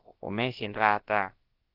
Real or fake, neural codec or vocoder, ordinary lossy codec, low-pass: fake; codec, 16 kHz, about 1 kbps, DyCAST, with the encoder's durations; Opus, 16 kbps; 5.4 kHz